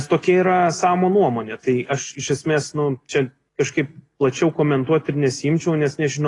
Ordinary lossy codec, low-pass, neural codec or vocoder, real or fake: AAC, 32 kbps; 10.8 kHz; none; real